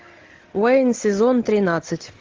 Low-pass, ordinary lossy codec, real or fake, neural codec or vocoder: 7.2 kHz; Opus, 16 kbps; real; none